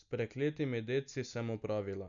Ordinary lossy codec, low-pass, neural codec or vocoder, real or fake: none; 7.2 kHz; none; real